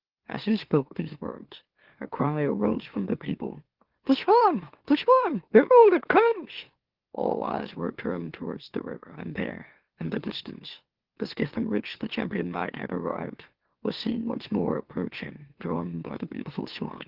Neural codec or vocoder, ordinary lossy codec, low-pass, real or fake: autoencoder, 44.1 kHz, a latent of 192 numbers a frame, MeloTTS; Opus, 16 kbps; 5.4 kHz; fake